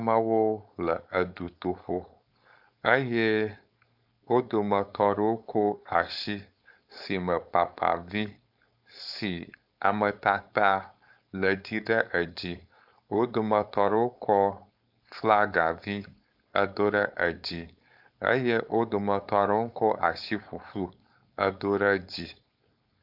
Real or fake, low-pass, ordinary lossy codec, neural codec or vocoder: fake; 5.4 kHz; AAC, 48 kbps; codec, 16 kHz, 4.8 kbps, FACodec